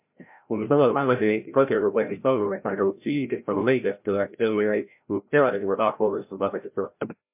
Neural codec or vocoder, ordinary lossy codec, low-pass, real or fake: codec, 16 kHz, 0.5 kbps, FreqCodec, larger model; MP3, 32 kbps; 3.6 kHz; fake